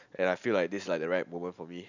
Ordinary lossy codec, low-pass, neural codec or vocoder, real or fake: none; 7.2 kHz; none; real